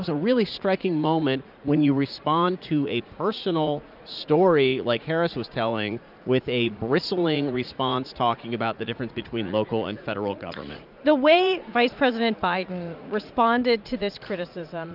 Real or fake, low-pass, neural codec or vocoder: fake; 5.4 kHz; vocoder, 44.1 kHz, 80 mel bands, Vocos